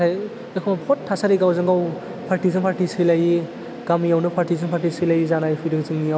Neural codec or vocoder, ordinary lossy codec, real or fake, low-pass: none; none; real; none